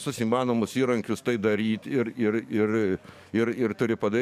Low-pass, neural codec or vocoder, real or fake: 14.4 kHz; codec, 44.1 kHz, 7.8 kbps, DAC; fake